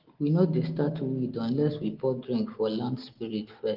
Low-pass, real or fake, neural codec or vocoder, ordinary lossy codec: 5.4 kHz; real; none; Opus, 16 kbps